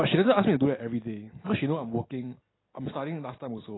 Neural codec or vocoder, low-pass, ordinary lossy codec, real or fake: none; 7.2 kHz; AAC, 16 kbps; real